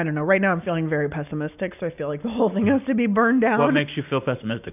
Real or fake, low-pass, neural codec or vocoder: real; 3.6 kHz; none